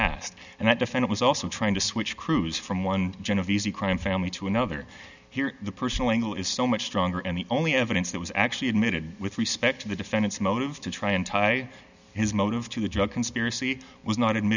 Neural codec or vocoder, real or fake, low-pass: none; real; 7.2 kHz